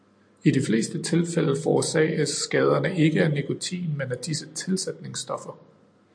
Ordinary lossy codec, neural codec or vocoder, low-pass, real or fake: AAC, 64 kbps; none; 9.9 kHz; real